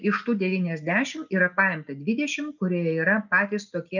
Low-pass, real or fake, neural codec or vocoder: 7.2 kHz; real; none